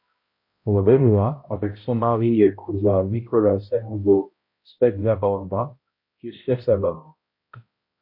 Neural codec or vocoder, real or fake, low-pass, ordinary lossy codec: codec, 16 kHz, 0.5 kbps, X-Codec, HuBERT features, trained on balanced general audio; fake; 5.4 kHz; MP3, 32 kbps